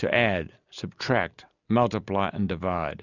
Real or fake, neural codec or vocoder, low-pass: real; none; 7.2 kHz